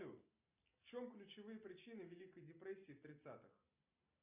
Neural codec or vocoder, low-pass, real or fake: none; 3.6 kHz; real